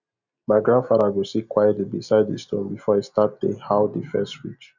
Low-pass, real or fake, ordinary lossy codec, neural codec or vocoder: 7.2 kHz; real; none; none